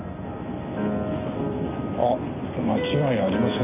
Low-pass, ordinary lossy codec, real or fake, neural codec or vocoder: 3.6 kHz; none; real; none